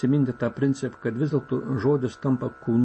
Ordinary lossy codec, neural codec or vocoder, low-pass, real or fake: MP3, 32 kbps; none; 10.8 kHz; real